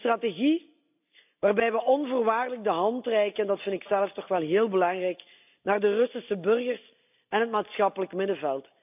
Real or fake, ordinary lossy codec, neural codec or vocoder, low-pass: real; AAC, 32 kbps; none; 3.6 kHz